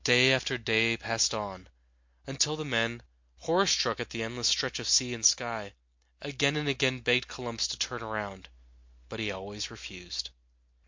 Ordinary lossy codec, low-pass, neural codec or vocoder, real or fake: MP3, 48 kbps; 7.2 kHz; none; real